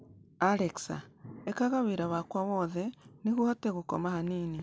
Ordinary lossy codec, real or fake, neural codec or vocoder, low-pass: none; real; none; none